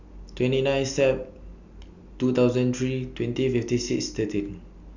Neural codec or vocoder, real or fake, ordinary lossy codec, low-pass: none; real; none; 7.2 kHz